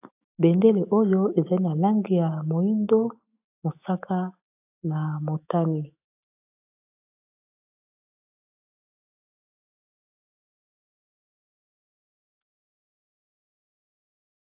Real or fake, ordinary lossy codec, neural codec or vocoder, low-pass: fake; AAC, 32 kbps; autoencoder, 48 kHz, 128 numbers a frame, DAC-VAE, trained on Japanese speech; 3.6 kHz